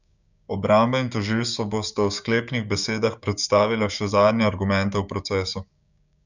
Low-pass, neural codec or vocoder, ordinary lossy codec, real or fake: 7.2 kHz; codec, 16 kHz, 6 kbps, DAC; none; fake